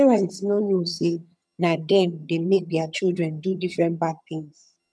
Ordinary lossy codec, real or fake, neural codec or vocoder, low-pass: none; fake; vocoder, 22.05 kHz, 80 mel bands, HiFi-GAN; none